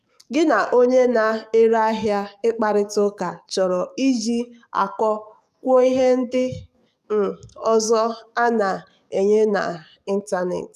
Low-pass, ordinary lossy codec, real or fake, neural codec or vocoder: 14.4 kHz; none; fake; codec, 44.1 kHz, 7.8 kbps, DAC